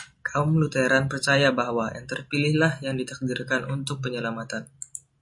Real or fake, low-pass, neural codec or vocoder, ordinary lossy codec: real; 10.8 kHz; none; MP3, 48 kbps